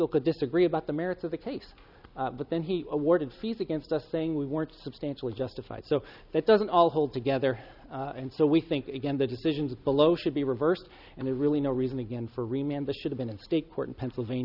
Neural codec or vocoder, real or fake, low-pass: none; real; 5.4 kHz